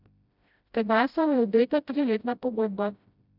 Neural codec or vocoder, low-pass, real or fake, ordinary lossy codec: codec, 16 kHz, 0.5 kbps, FreqCodec, smaller model; 5.4 kHz; fake; none